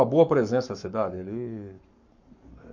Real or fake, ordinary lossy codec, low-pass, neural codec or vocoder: fake; none; 7.2 kHz; codec, 44.1 kHz, 7.8 kbps, Pupu-Codec